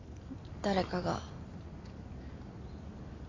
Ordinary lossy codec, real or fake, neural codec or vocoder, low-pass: AAC, 32 kbps; real; none; 7.2 kHz